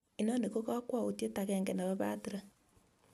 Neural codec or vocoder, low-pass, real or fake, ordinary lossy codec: none; 14.4 kHz; real; MP3, 96 kbps